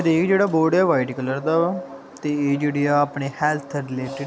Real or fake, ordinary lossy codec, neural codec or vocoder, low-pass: real; none; none; none